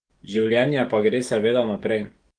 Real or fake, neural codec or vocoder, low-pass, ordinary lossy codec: fake; codec, 44.1 kHz, 7.8 kbps, DAC; 9.9 kHz; Opus, 24 kbps